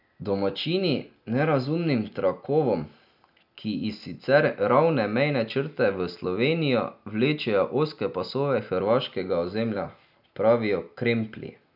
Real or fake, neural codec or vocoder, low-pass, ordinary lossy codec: real; none; 5.4 kHz; none